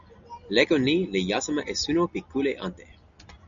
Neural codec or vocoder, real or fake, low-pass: none; real; 7.2 kHz